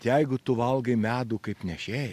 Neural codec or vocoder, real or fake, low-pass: none; real; 14.4 kHz